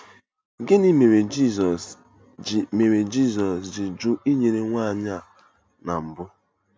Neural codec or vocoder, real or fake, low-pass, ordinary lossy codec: none; real; none; none